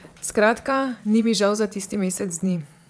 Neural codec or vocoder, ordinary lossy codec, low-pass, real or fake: vocoder, 22.05 kHz, 80 mel bands, Vocos; none; none; fake